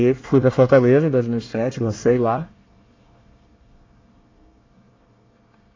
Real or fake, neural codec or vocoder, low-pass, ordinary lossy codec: fake; codec, 24 kHz, 1 kbps, SNAC; 7.2 kHz; AAC, 32 kbps